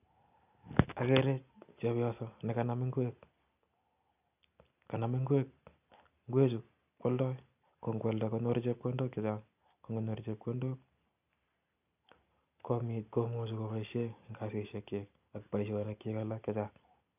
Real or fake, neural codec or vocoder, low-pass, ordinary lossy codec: real; none; 3.6 kHz; none